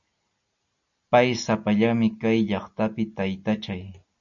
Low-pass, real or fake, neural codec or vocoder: 7.2 kHz; real; none